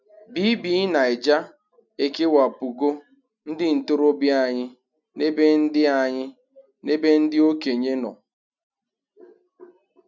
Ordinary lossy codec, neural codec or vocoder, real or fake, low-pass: none; none; real; 7.2 kHz